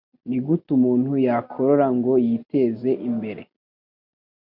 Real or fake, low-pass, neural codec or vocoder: real; 5.4 kHz; none